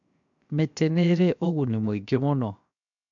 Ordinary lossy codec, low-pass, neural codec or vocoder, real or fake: none; 7.2 kHz; codec, 16 kHz, 0.7 kbps, FocalCodec; fake